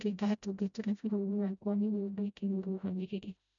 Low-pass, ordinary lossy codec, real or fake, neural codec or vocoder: 7.2 kHz; none; fake; codec, 16 kHz, 0.5 kbps, FreqCodec, smaller model